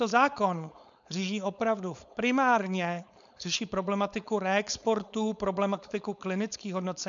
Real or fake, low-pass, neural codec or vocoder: fake; 7.2 kHz; codec, 16 kHz, 4.8 kbps, FACodec